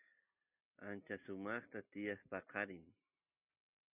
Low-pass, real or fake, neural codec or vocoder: 3.6 kHz; real; none